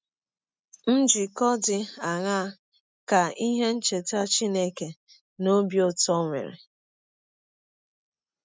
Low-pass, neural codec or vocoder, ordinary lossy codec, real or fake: none; none; none; real